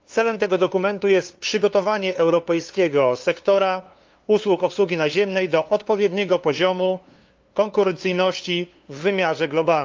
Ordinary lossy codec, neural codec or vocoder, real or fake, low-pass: Opus, 32 kbps; codec, 16 kHz, 2 kbps, FunCodec, trained on LibriTTS, 25 frames a second; fake; 7.2 kHz